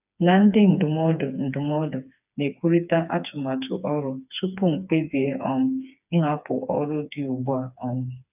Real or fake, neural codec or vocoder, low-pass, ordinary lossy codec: fake; codec, 16 kHz, 4 kbps, FreqCodec, smaller model; 3.6 kHz; none